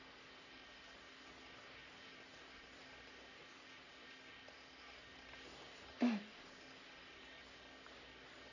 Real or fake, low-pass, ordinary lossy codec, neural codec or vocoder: fake; 7.2 kHz; none; codec, 44.1 kHz, 3.4 kbps, Pupu-Codec